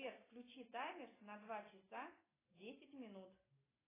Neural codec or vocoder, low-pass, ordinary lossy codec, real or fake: none; 3.6 kHz; AAC, 16 kbps; real